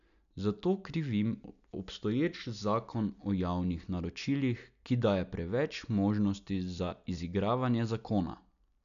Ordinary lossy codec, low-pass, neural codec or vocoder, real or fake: none; 7.2 kHz; none; real